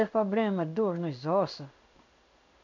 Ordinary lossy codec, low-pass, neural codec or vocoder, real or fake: none; 7.2 kHz; codec, 16 kHz in and 24 kHz out, 1 kbps, XY-Tokenizer; fake